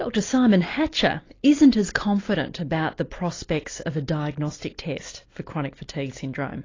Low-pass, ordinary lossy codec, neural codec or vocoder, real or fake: 7.2 kHz; AAC, 32 kbps; none; real